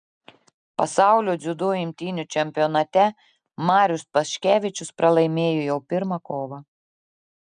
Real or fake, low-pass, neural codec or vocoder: real; 9.9 kHz; none